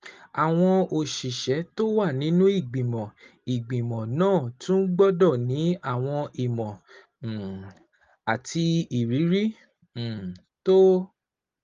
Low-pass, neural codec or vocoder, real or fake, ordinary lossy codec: 7.2 kHz; none; real; Opus, 32 kbps